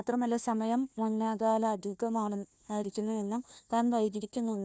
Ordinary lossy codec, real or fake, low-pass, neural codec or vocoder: none; fake; none; codec, 16 kHz, 1 kbps, FunCodec, trained on Chinese and English, 50 frames a second